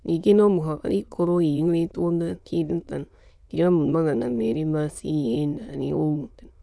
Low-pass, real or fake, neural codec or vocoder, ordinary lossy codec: none; fake; autoencoder, 22.05 kHz, a latent of 192 numbers a frame, VITS, trained on many speakers; none